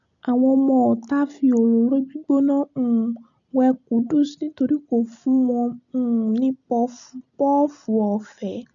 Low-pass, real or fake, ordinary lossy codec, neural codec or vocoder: 7.2 kHz; real; none; none